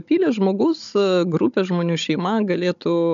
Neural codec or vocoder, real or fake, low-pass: codec, 16 kHz, 16 kbps, FunCodec, trained on Chinese and English, 50 frames a second; fake; 7.2 kHz